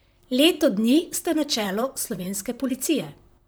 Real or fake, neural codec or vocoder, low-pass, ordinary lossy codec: fake; vocoder, 44.1 kHz, 128 mel bands, Pupu-Vocoder; none; none